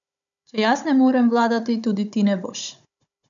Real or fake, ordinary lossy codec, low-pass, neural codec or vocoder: fake; none; 7.2 kHz; codec, 16 kHz, 16 kbps, FunCodec, trained on Chinese and English, 50 frames a second